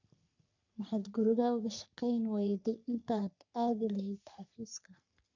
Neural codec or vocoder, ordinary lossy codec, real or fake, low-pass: codec, 44.1 kHz, 3.4 kbps, Pupu-Codec; none; fake; 7.2 kHz